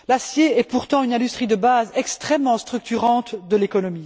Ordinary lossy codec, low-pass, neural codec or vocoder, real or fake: none; none; none; real